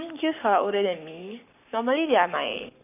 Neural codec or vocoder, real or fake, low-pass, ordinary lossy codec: codec, 44.1 kHz, 7.8 kbps, Pupu-Codec; fake; 3.6 kHz; none